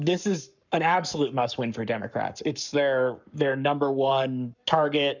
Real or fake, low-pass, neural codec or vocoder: fake; 7.2 kHz; codec, 44.1 kHz, 7.8 kbps, Pupu-Codec